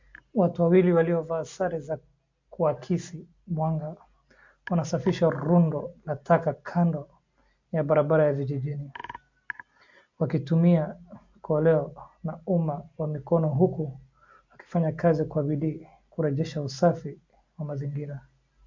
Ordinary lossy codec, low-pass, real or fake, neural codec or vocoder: MP3, 48 kbps; 7.2 kHz; real; none